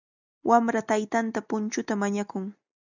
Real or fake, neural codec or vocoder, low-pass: real; none; 7.2 kHz